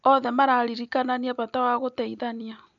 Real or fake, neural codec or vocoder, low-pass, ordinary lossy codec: real; none; 7.2 kHz; none